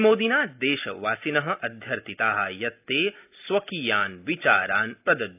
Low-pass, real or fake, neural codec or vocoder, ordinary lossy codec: 3.6 kHz; real; none; AAC, 32 kbps